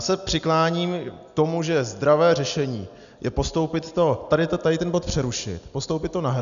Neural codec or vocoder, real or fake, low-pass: none; real; 7.2 kHz